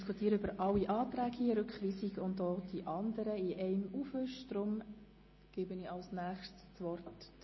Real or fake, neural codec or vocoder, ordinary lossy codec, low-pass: real; none; MP3, 24 kbps; 7.2 kHz